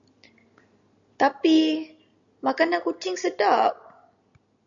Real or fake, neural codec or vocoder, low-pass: real; none; 7.2 kHz